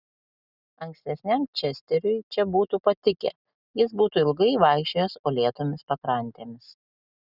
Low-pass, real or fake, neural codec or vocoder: 5.4 kHz; real; none